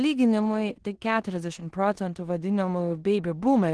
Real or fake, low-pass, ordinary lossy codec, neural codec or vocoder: fake; 10.8 kHz; Opus, 16 kbps; codec, 16 kHz in and 24 kHz out, 0.9 kbps, LongCat-Audio-Codec, four codebook decoder